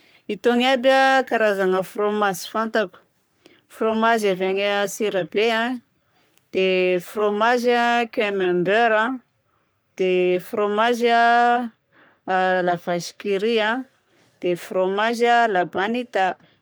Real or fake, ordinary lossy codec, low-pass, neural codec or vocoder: fake; none; none; codec, 44.1 kHz, 3.4 kbps, Pupu-Codec